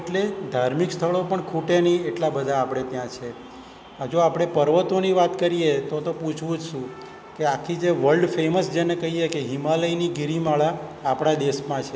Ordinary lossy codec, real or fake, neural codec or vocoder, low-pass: none; real; none; none